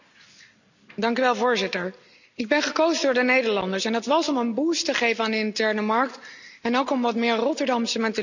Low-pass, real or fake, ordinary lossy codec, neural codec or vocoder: 7.2 kHz; real; none; none